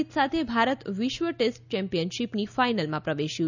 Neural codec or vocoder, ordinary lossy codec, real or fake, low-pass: none; none; real; 7.2 kHz